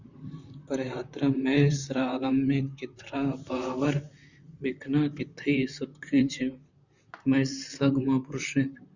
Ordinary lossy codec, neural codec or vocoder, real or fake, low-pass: Opus, 64 kbps; vocoder, 44.1 kHz, 128 mel bands, Pupu-Vocoder; fake; 7.2 kHz